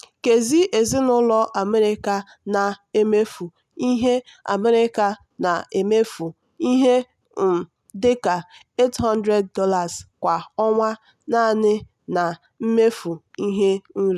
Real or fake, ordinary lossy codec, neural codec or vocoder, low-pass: real; none; none; 14.4 kHz